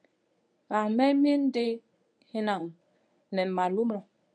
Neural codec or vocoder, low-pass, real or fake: codec, 24 kHz, 0.9 kbps, WavTokenizer, medium speech release version 1; 9.9 kHz; fake